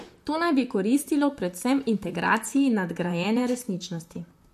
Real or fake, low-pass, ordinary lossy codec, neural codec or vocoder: fake; 14.4 kHz; MP3, 64 kbps; vocoder, 44.1 kHz, 128 mel bands, Pupu-Vocoder